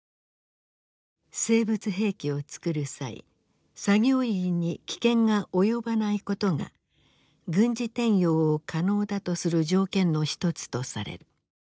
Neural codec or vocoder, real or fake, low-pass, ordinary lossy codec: none; real; none; none